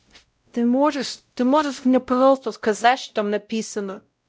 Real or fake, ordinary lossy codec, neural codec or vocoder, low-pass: fake; none; codec, 16 kHz, 0.5 kbps, X-Codec, WavLM features, trained on Multilingual LibriSpeech; none